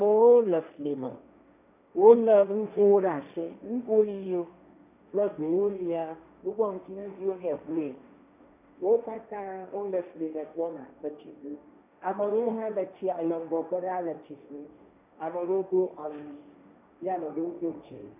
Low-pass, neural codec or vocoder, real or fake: 3.6 kHz; codec, 16 kHz, 1.1 kbps, Voila-Tokenizer; fake